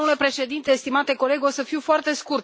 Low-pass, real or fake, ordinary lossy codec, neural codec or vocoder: none; real; none; none